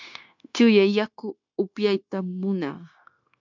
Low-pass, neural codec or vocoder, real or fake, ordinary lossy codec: 7.2 kHz; codec, 16 kHz, 0.9 kbps, LongCat-Audio-Codec; fake; MP3, 64 kbps